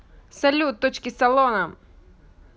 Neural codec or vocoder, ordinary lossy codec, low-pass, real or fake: none; none; none; real